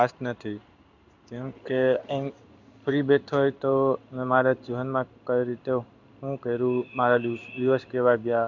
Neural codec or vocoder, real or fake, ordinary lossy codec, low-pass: codec, 16 kHz in and 24 kHz out, 1 kbps, XY-Tokenizer; fake; none; 7.2 kHz